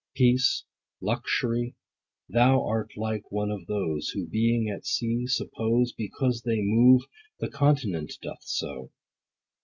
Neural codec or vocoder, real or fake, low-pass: none; real; 7.2 kHz